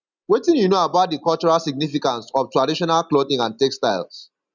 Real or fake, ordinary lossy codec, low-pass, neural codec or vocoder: real; none; 7.2 kHz; none